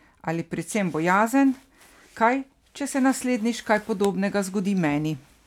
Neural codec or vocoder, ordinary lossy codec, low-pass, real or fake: none; none; 19.8 kHz; real